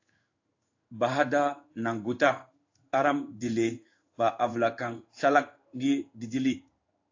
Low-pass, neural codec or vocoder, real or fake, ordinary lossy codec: 7.2 kHz; codec, 16 kHz in and 24 kHz out, 1 kbps, XY-Tokenizer; fake; AAC, 48 kbps